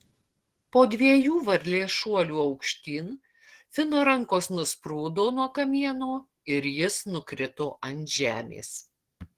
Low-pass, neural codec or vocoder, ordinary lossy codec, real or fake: 14.4 kHz; codec, 44.1 kHz, 7.8 kbps, DAC; Opus, 16 kbps; fake